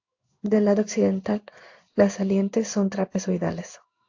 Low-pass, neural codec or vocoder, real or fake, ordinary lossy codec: 7.2 kHz; codec, 16 kHz in and 24 kHz out, 1 kbps, XY-Tokenizer; fake; AAC, 48 kbps